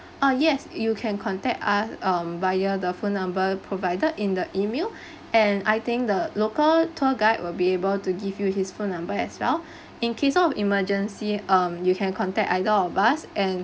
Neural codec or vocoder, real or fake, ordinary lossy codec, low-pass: none; real; none; none